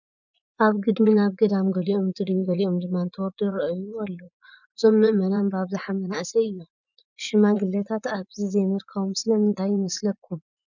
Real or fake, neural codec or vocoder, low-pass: fake; vocoder, 24 kHz, 100 mel bands, Vocos; 7.2 kHz